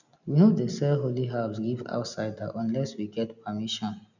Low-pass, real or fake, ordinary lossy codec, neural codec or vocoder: 7.2 kHz; real; none; none